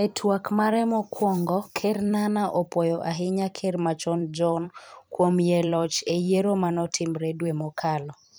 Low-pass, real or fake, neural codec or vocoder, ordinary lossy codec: none; fake; vocoder, 44.1 kHz, 128 mel bands every 256 samples, BigVGAN v2; none